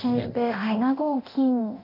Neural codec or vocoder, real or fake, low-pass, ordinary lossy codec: codec, 24 kHz, 0.9 kbps, DualCodec; fake; 5.4 kHz; none